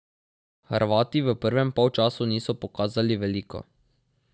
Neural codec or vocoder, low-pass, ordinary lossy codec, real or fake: none; none; none; real